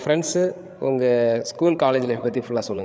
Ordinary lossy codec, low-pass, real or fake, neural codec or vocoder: none; none; fake; codec, 16 kHz, 8 kbps, FreqCodec, larger model